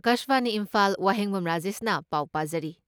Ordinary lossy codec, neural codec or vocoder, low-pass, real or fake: none; none; none; real